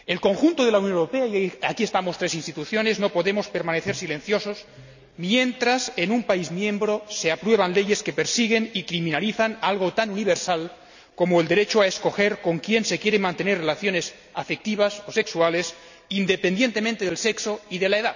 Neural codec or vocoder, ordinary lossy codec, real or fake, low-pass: none; none; real; 7.2 kHz